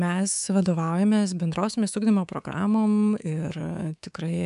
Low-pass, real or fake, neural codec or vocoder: 10.8 kHz; fake; codec, 24 kHz, 3.1 kbps, DualCodec